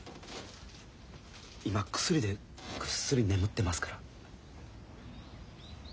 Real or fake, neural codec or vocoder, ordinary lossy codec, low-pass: real; none; none; none